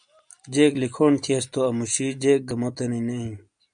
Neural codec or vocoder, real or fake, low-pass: none; real; 9.9 kHz